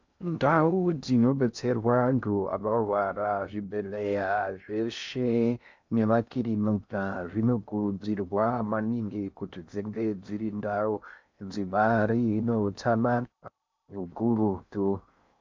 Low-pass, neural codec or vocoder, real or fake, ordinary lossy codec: 7.2 kHz; codec, 16 kHz in and 24 kHz out, 0.6 kbps, FocalCodec, streaming, 2048 codes; fake; MP3, 64 kbps